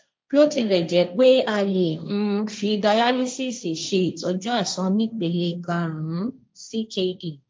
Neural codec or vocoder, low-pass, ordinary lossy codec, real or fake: codec, 16 kHz, 1.1 kbps, Voila-Tokenizer; none; none; fake